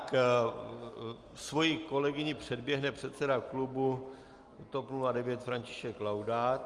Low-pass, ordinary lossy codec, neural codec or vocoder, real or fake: 10.8 kHz; Opus, 32 kbps; none; real